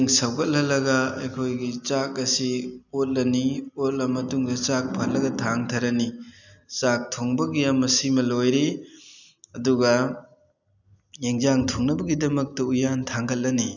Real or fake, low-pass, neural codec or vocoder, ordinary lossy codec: real; 7.2 kHz; none; none